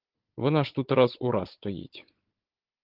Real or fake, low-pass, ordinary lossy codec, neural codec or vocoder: fake; 5.4 kHz; Opus, 16 kbps; codec, 16 kHz, 16 kbps, FunCodec, trained on Chinese and English, 50 frames a second